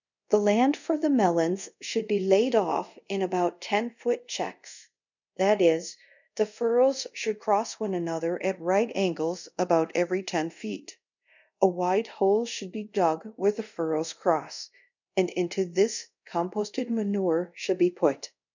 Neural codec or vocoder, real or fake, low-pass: codec, 24 kHz, 0.5 kbps, DualCodec; fake; 7.2 kHz